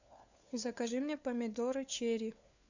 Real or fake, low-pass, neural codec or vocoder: fake; 7.2 kHz; codec, 16 kHz, 4 kbps, FunCodec, trained on LibriTTS, 50 frames a second